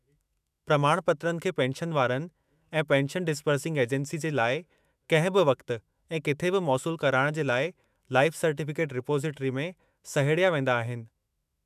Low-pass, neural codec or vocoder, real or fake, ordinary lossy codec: 14.4 kHz; autoencoder, 48 kHz, 128 numbers a frame, DAC-VAE, trained on Japanese speech; fake; none